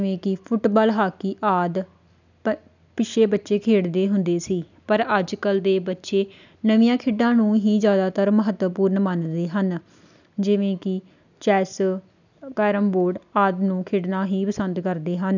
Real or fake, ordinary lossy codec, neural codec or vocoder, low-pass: real; none; none; 7.2 kHz